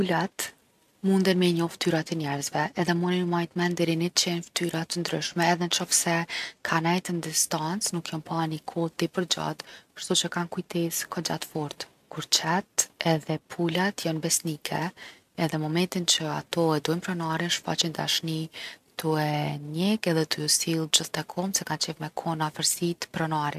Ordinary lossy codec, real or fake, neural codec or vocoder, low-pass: none; real; none; 14.4 kHz